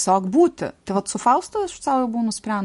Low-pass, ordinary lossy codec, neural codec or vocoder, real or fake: 14.4 kHz; MP3, 48 kbps; none; real